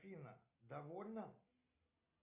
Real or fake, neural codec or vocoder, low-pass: real; none; 3.6 kHz